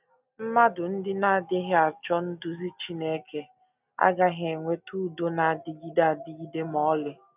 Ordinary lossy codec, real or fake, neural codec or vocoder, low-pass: none; real; none; 3.6 kHz